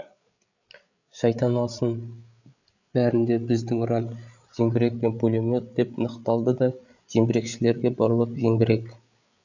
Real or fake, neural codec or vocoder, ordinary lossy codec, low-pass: fake; codec, 16 kHz, 8 kbps, FreqCodec, larger model; none; 7.2 kHz